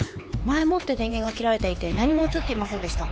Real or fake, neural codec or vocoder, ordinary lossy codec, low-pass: fake; codec, 16 kHz, 4 kbps, X-Codec, HuBERT features, trained on LibriSpeech; none; none